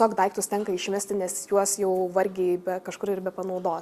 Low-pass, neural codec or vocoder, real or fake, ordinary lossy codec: 14.4 kHz; none; real; Opus, 64 kbps